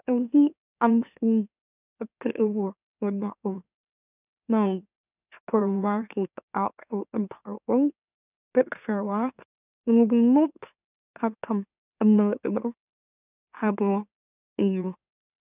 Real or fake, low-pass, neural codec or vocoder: fake; 3.6 kHz; autoencoder, 44.1 kHz, a latent of 192 numbers a frame, MeloTTS